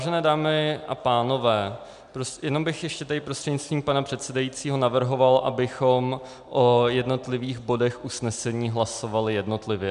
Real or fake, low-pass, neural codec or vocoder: real; 10.8 kHz; none